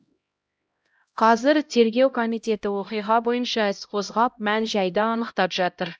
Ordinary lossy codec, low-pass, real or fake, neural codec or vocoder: none; none; fake; codec, 16 kHz, 0.5 kbps, X-Codec, HuBERT features, trained on LibriSpeech